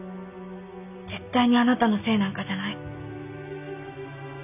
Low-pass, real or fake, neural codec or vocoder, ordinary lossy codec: 3.6 kHz; real; none; none